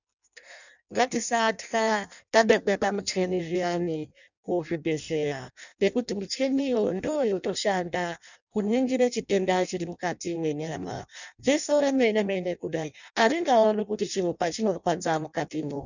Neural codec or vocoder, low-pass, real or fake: codec, 16 kHz in and 24 kHz out, 0.6 kbps, FireRedTTS-2 codec; 7.2 kHz; fake